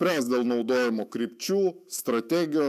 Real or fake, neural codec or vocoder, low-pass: fake; autoencoder, 48 kHz, 128 numbers a frame, DAC-VAE, trained on Japanese speech; 14.4 kHz